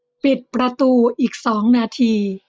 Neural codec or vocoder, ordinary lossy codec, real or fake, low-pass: none; none; real; none